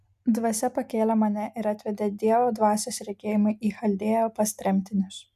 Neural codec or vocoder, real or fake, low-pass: none; real; 14.4 kHz